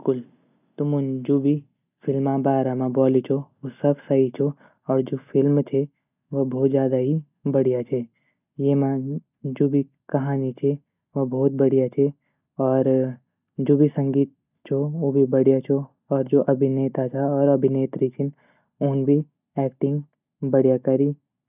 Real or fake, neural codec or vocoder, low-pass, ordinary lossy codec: real; none; 3.6 kHz; none